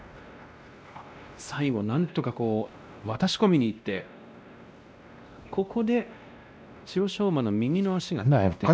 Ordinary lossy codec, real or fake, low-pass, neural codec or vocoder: none; fake; none; codec, 16 kHz, 1 kbps, X-Codec, WavLM features, trained on Multilingual LibriSpeech